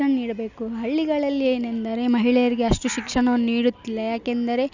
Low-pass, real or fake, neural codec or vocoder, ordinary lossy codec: 7.2 kHz; real; none; none